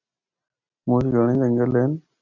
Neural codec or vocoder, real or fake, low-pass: none; real; 7.2 kHz